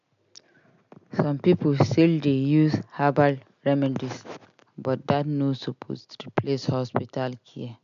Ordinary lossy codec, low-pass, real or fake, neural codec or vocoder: MP3, 64 kbps; 7.2 kHz; real; none